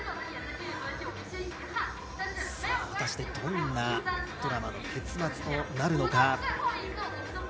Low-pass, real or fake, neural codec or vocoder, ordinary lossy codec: none; real; none; none